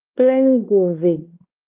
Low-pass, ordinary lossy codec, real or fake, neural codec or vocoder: 3.6 kHz; Opus, 24 kbps; fake; codec, 16 kHz, 2 kbps, X-Codec, WavLM features, trained on Multilingual LibriSpeech